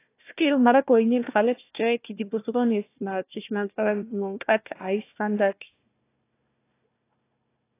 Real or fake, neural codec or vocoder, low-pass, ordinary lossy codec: fake; codec, 16 kHz, 1 kbps, FunCodec, trained on Chinese and English, 50 frames a second; 3.6 kHz; AAC, 24 kbps